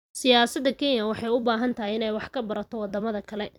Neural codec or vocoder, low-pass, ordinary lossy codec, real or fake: vocoder, 44.1 kHz, 128 mel bands every 256 samples, BigVGAN v2; 19.8 kHz; none; fake